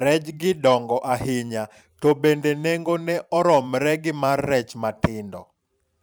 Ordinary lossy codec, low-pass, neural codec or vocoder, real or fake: none; none; vocoder, 44.1 kHz, 128 mel bands every 512 samples, BigVGAN v2; fake